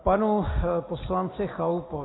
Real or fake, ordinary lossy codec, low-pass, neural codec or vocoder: real; AAC, 16 kbps; 7.2 kHz; none